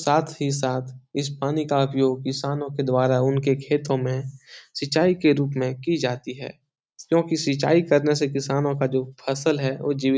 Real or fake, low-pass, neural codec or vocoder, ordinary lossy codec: real; none; none; none